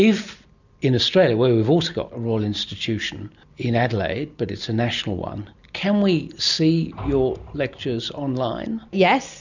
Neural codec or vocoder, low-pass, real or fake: none; 7.2 kHz; real